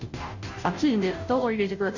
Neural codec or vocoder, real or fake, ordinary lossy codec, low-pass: codec, 16 kHz, 0.5 kbps, FunCodec, trained on Chinese and English, 25 frames a second; fake; none; 7.2 kHz